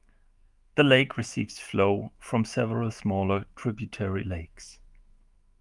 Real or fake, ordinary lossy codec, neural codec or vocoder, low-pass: fake; Opus, 32 kbps; autoencoder, 48 kHz, 128 numbers a frame, DAC-VAE, trained on Japanese speech; 10.8 kHz